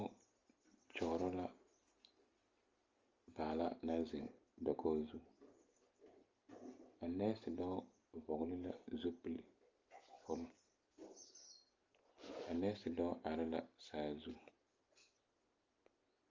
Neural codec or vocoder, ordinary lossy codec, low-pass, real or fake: none; Opus, 32 kbps; 7.2 kHz; real